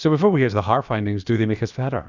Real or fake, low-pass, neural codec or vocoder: fake; 7.2 kHz; codec, 16 kHz, about 1 kbps, DyCAST, with the encoder's durations